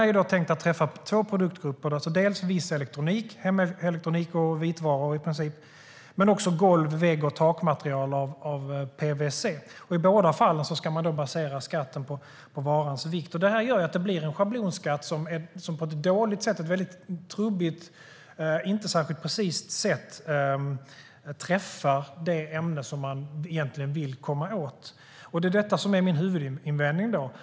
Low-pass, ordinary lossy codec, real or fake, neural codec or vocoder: none; none; real; none